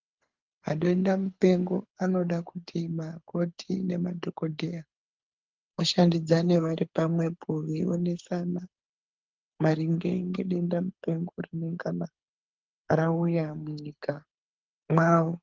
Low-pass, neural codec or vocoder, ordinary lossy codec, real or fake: 7.2 kHz; codec, 24 kHz, 6 kbps, HILCodec; Opus, 24 kbps; fake